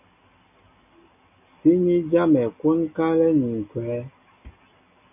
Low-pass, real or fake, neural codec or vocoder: 3.6 kHz; real; none